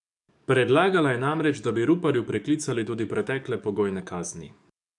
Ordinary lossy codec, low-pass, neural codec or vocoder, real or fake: none; 10.8 kHz; codec, 44.1 kHz, 7.8 kbps, DAC; fake